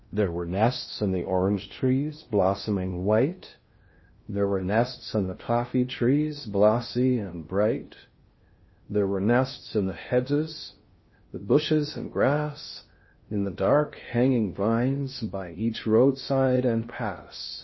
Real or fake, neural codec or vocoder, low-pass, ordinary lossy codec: fake; codec, 16 kHz in and 24 kHz out, 0.6 kbps, FocalCodec, streaming, 4096 codes; 7.2 kHz; MP3, 24 kbps